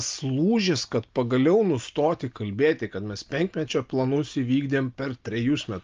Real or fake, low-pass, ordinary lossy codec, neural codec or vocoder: real; 7.2 kHz; Opus, 24 kbps; none